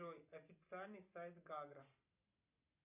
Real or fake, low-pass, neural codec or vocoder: real; 3.6 kHz; none